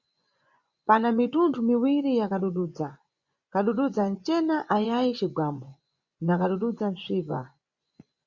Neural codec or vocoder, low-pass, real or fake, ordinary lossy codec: vocoder, 24 kHz, 100 mel bands, Vocos; 7.2 kHz; fake; Opus, 64 kbps